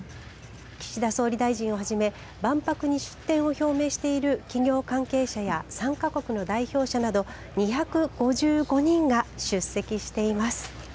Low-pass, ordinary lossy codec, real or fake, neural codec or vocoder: none; none; real; none